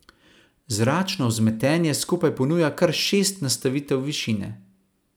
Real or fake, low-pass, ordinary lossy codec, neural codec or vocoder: real; none; none; none